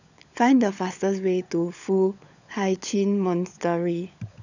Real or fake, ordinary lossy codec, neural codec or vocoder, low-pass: fake; none; codec, 16 kHz, 16 kbps, FunCodec, trained on LibriTTS, 50 frames a second; 7.2 kHz